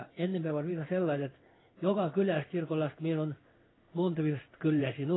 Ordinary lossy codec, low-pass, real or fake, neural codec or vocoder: AAC, 16 kbps; 7.2 kHz; fake; codec, 16 kHz in and 24 kHz out, 1 kbps, XY-Tokenizer